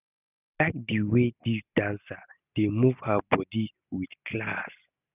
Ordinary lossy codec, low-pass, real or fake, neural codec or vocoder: none; 3.6 kHz; real; none